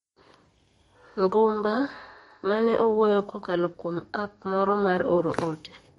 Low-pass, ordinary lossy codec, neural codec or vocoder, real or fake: 14.4 kHz; MP3, 48 kbps; codec, 32 kHz, 1.9 kbps, SNAC; fake